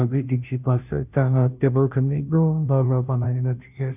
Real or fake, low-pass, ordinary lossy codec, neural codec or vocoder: fake; 3.6 kHz; none; codec, 16 kHz, 0.5 kbps, FunCodec, trained on Chinese and English, 25 frames a second